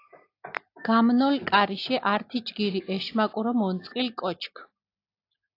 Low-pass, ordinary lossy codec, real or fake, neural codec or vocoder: 5.4 kHz; AAC, 32 kbps; real; none